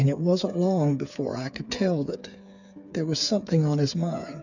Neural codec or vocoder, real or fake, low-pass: codec, 16 kHz, 8 kbps, FreqCodec, smaller model; fake; 7.2 kHz